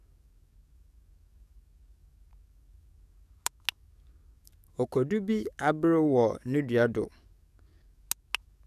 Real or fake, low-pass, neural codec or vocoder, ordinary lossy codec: fake; 14.4 kHz; codec, 44.1 kHz, 7.8 kbps, DAC; none